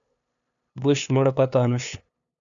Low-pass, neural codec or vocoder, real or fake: 7.2 kHz; codec, 16 kHz, 2 kbps, FunCodec, trained on LibriTTS, 25 frames a second; fake